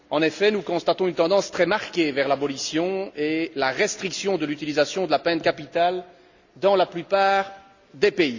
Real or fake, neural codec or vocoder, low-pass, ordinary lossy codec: real; none; 7.2 kHz; Opus, 64 kbps